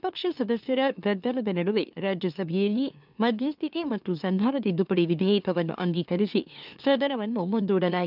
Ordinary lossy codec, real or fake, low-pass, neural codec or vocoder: none; fake; 5.4 kHz; autoencoder, 44.1 kHz, a latent of 192 numbers a frame, MeloTTS